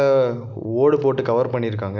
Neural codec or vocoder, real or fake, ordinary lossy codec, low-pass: none; real; none; 7.2 kHz